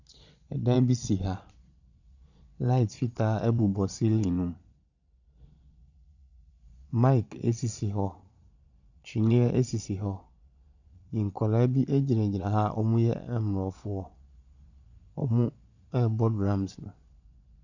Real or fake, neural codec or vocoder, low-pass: fake; vocoder, 22.05 kHz, 80 mel bands, Vocos; 7.2 kHz